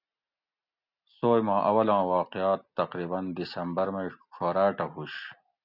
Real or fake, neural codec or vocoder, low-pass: real; none; 5.4 kHz